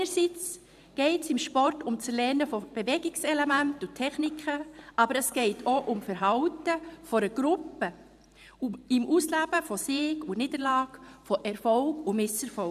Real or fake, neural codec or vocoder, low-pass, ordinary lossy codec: fake; vocoder, 44.1 kHz, 128 mel bands every 512 samples, BigVGAN v2; 14.4 kHz; none